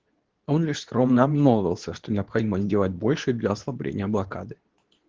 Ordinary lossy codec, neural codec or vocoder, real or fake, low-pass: Opus, 16 kbps; codec, 24 kHz, 0.9 kbps, WavTokenizer, medium speech release version 2; fake; 7.2 kHz